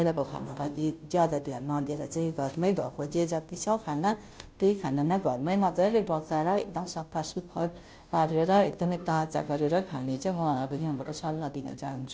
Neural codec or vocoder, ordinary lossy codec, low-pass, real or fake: codec, 16 kHz, 0.5 kbps, FunCodec, trained on Chinese and English, 25 frames a second; none; none; fake